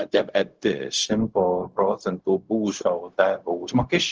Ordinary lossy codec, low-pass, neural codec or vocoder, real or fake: Opus, 16 kbps; 7.2 kHz; codec, 16 kHz, 0.4 kbps, LongCat-Audio-Codec; fake